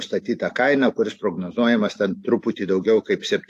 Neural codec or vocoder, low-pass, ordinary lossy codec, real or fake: none; 14.4 kHz; AAC, 64 kbps; real